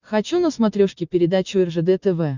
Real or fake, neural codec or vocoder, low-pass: real; none; 7.2 kHz